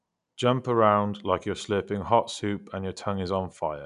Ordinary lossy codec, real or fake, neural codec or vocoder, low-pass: none; real; none; 10.8 kHz